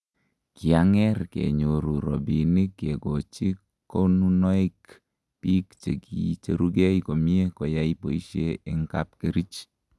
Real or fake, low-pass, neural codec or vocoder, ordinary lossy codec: real; none; none; none